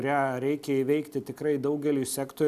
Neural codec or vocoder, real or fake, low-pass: none; real; 14.4 kHz